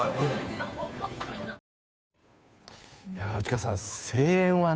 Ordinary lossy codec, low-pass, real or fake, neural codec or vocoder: none; none; fake; codec, 16 kHz, 2 kbps, FunCodec, trained on Chinese and English, 25 frames a second